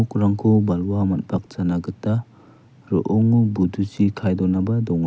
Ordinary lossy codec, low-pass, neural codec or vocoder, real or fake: none; none; none; real